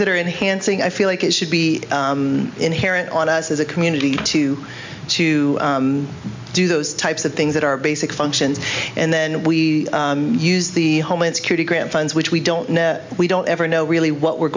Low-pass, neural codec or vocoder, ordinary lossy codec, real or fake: 7.2 kHz; none; MP3, 64 kbps; real